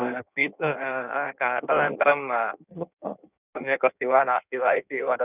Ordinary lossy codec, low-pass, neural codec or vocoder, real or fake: none; 3.6 kHz; codec, 16 kHz in and 24 kHz out, 1.1 kbps, FireRedTTS-2 codec; fake